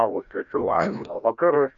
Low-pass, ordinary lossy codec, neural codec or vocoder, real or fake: 7.2 kHz; MP3, 96 kbps; codec, 16 kHz, 1 kbps, FreqCodec, larger model; fake